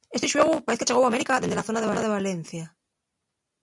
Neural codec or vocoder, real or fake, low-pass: none; real; 10.8 kHz